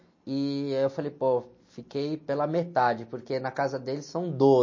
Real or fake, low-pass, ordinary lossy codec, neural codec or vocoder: real; 7.2 kHz; MP3, 32 kbps; none